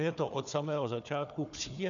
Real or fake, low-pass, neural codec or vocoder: fake; 7.2 kHz; codec, 16 kHz, 4 kbps, FunCodec, trained on Chinese and English, 50 frames a second